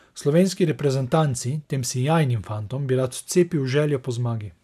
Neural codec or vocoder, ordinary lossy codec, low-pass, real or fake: none; none; 14.4 kHz; real